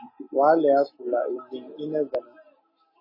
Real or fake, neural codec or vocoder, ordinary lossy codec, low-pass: real; none; MP3, 24 kbps; 5.4 kHz